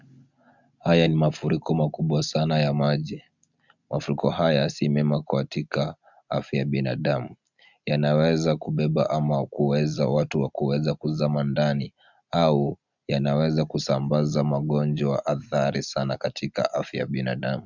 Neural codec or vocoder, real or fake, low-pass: none; real; 7.2 kHz